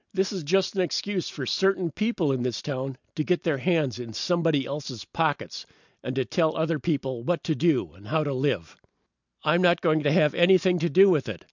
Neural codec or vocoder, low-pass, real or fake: none; 7.2 kHz; real